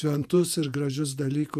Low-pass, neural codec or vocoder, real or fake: 14.4 kHz; vocoder, 48 kHz, 128 mel bands, Vocos; fake